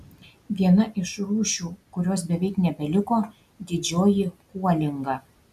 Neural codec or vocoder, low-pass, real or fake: none; 14.4 kHz; real